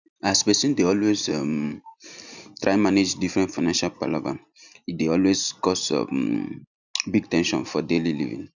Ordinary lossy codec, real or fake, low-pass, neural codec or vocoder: none; real; 7.2 kHz; none